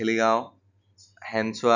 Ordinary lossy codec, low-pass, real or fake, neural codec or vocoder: none; 7.2 kHz; real; none